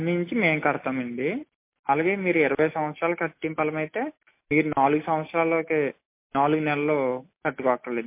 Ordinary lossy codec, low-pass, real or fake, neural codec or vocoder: MP3, 24 kbps; 3.6 kHz; real; none